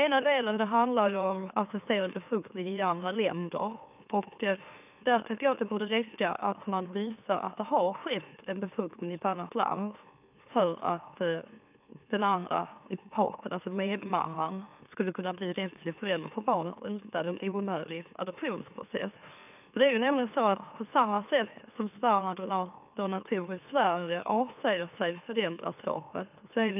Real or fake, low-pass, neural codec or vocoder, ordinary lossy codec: fake; 3.6 kHz; autoencoder, 44.1 kHz, a latent of 192 numbers a frame, MeloTTS; none